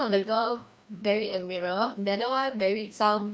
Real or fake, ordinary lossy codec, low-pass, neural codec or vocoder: fake; none; none; codec, 16 kHz, 1 kbps, FreqCodec, larger model